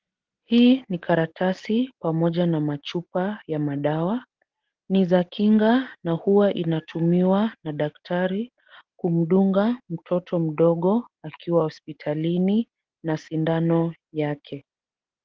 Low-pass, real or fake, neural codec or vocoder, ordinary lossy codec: 7.2 kHz; real; none; Opus, 16 kbps